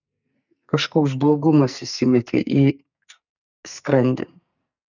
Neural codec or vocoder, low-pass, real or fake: codec, 44.1 kHz, 2.6 kbps, SNAC; 7.2 kHz; fake